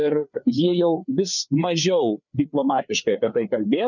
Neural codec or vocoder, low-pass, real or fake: codec, 16 kHz, 4 kbps, FreqCodec, larger model; 7.2 kHz; fake